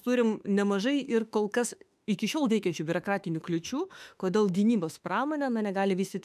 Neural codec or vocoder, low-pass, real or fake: autoencoder, 48 kHz, 32 numbers a frame, DAC-VAE, trained on Japanese speech; 14.4 kHz; fake